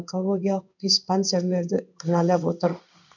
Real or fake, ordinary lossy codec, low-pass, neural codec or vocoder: fake; none; 7.2 kHz; codec, 16 kHz in and 24 kHz out, 1 kbps, XY-Tokenizer